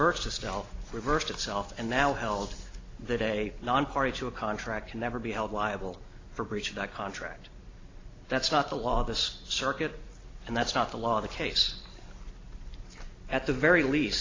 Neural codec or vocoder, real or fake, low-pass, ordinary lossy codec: none; real; 7.2 kHz; AAC, 32 kbps